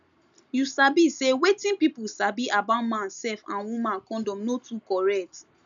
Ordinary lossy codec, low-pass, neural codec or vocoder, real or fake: none; 7.2 kHz; none; real